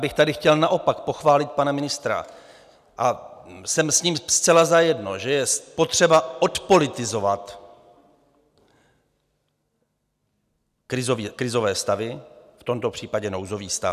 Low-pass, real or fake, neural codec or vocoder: 14.4 kHz; real; none